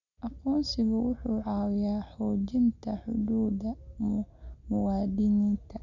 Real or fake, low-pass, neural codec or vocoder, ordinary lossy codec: real; 7.2 kHz; none; none